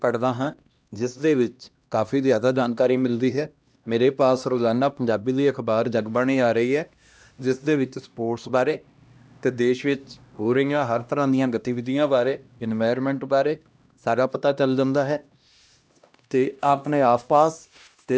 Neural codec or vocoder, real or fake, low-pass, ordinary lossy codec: codec, 16 kHz, 1 kbps, X-Codec, HuBERT features, trained on LibriSpeech; fake; none; none